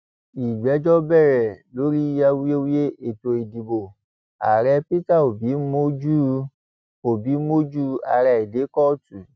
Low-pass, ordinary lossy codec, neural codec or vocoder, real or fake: none; none; none; real